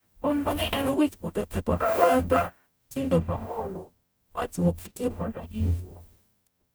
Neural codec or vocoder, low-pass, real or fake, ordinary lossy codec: codec, 44.1 kHz, 0.9 kbps, DAC; none; fake; none